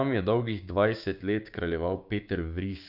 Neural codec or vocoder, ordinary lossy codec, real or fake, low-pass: codec, 16 kHz, 6 kbps, DAC; none; fake; 5.4 kHz